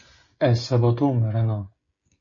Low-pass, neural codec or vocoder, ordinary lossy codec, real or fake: 7.2 kHz; codec, 16 kHz, 16 kbps, FreqCodec, smaller model; MP3, 32 kbps; fake